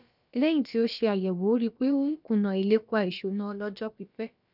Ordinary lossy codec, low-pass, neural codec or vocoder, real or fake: none; 5.4 kHz; codec, 16 kHz, about 1 kbps, DyCAST, with the encoder's durations; fake